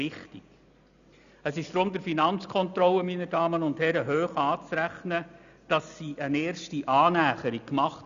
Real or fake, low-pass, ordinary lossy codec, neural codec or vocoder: real; 7.2 kHz; none; none